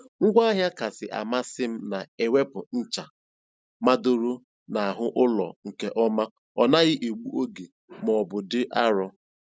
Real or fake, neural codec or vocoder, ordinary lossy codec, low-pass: real; none; none; none